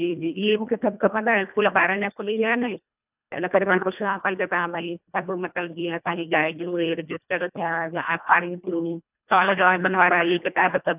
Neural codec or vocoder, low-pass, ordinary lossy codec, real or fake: codec, 24 kHz, 1.5 kbps, HILCodec; 3.6 kHz; none; fake